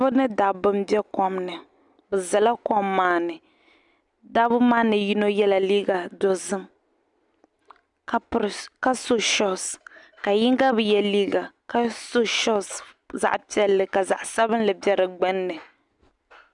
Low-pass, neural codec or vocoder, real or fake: 10.8 kHz; none; real